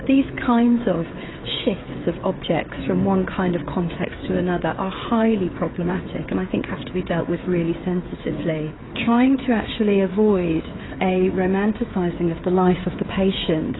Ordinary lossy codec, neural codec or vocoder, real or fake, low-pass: AAC, 16 kbps; codec, 16 kHz, 16 kbps, FreqCodec, smaller model; fake; 7.2 kHz